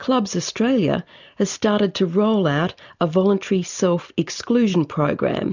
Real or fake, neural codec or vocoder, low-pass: real; none; 7.2 kHz